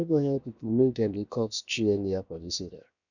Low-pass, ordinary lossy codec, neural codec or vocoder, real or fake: 7.2 kHz; none; codec, 16 kHz, 0.7 kbps, FocalCodec; fake